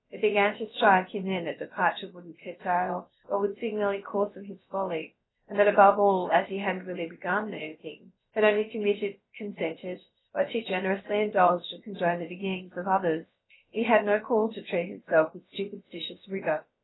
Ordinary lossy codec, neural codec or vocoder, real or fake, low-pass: AAC, 16 kbps; codec, 16 kHz, about 1 kbps, DyCAST, with the encoder's durations; fake; 7.2 kHz